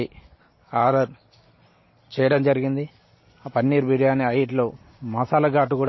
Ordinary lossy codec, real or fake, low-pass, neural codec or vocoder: MP3, 24 kbps; real; 7.2 kHz; none